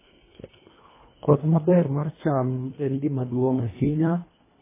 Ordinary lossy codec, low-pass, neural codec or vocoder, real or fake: MP3, 16 kbps; 3.6 kHz; codec, 24 kHz, 1.5 kbps, HILCodec; fake